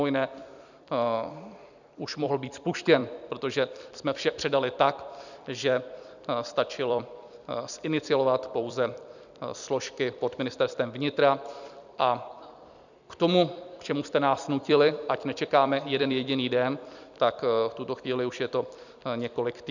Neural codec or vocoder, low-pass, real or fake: vocoder, 44.1 kHz, 128 mel bands every 256 samples, BigVGAN v2; 7.2 kHz; fake